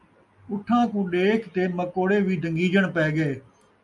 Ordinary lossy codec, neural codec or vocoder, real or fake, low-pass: MP3, 96 kbps; none; real; 10.8 kHz